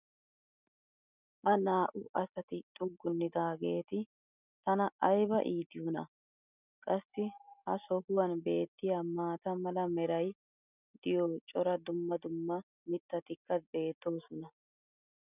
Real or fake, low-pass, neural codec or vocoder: real; 3.6 kHz; none